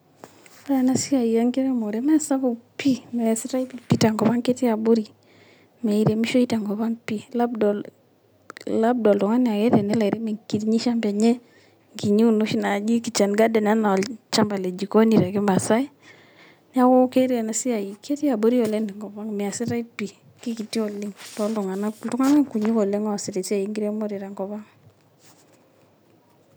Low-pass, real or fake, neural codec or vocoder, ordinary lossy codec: none; real; none; none